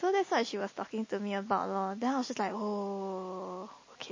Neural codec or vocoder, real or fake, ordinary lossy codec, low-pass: none; real; MP3, 32 kbps; 7.2 kHz